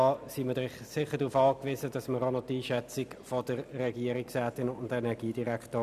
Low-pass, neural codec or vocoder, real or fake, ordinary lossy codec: 14.4 kHz; none; real; none